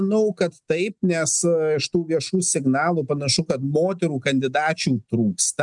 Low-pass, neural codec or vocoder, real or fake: 10.8 kHz; none; real